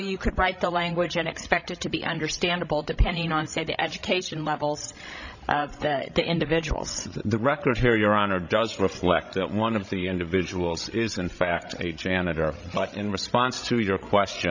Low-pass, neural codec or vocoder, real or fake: 7.2 kHz; codec, 16 kHz, 16 kbps, FreqCodec, larger model; fake